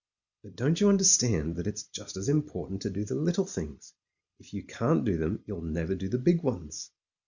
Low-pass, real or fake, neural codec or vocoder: 7.2 kHz; real; none